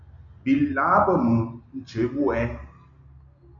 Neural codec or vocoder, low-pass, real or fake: none; 7.2 kHz; real